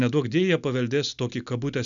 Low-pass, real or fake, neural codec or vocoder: 7.2 kHz; real; none